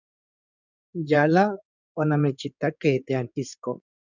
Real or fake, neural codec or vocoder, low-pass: fake; codec, 16 kHz in and 24 kHz out, 2.2 kbps, FireRedTTS-2 codec; 7.2 kHz